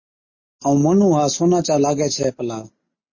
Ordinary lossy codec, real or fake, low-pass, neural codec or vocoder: MP3, 32 kbps; real; 7.2 kHz; none